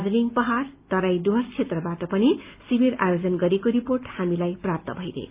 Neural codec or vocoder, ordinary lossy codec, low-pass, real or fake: none; Opus, 24 kbps; 3.6 kHz; real